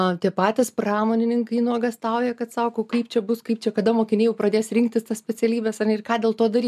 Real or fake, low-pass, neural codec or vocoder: real; 14.4 kHz; none